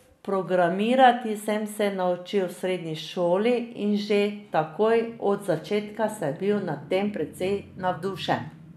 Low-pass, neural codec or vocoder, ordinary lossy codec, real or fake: 14.4 kHz; none; none; real